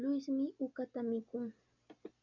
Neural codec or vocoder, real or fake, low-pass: none; real; 7.2 kHz